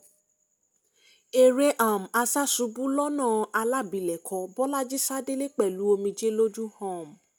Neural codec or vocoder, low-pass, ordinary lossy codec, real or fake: none; none; none; real